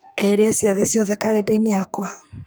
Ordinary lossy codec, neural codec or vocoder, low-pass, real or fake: none; codec, 44.1 kHz, 2.6 kbps, SNAC; none; fake